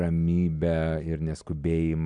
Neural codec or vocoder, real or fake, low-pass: none; real; 9.9 kHz